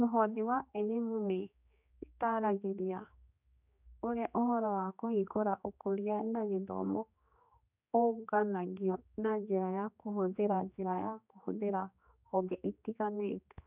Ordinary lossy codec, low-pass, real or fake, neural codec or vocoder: none; 3.6 kHz; fake; codec, 16 kHz, 2 kbps, X-Codec, HuBERT features, trained on general audio